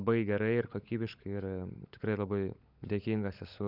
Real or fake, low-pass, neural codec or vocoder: fake; 5.4 kHz; codec, 16 kHz, 16 kbps, FunCodec, trained on LibriTTS, 50 frames a second